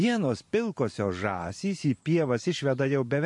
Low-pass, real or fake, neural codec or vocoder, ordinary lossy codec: 10.8 kHz; fake; vocoder, 44.1 kHz, 128 mel bands every 512 samples, BigVGAN v2; MP3, 48 kbps